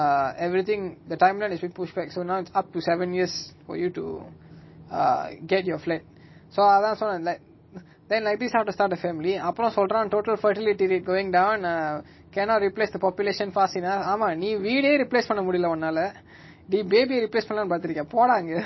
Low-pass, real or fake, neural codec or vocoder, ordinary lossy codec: 7.2 kHz; real; none; MP3, 24 kbps